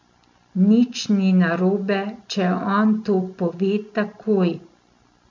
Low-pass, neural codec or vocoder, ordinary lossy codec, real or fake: 7.2 kHz; none; MP3, 48 kbps; real